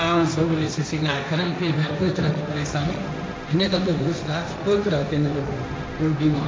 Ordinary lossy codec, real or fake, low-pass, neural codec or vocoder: none; fake; none; codec, 16 kHz, 1.1 kbps, Voila-Tokenizer